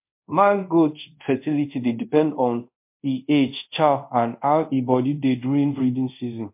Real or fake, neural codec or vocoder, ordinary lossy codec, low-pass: fake; codec, 24 kHz, 0.5 kbps, DualCodec; MP3, 32 kbps; 3.6 kHz